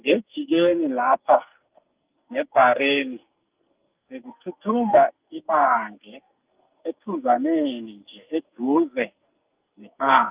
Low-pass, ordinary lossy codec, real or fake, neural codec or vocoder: 3.6 kHz; Opus, 24 kbps; fake; codec, 44.1 kHz, 2.6 kbps, SNAC